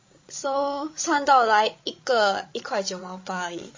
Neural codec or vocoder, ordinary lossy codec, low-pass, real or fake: codec, 16 kHz, 16 kbps, FreqCodec, larger model; MP3, 32 kbps; 7.2 kHz; fake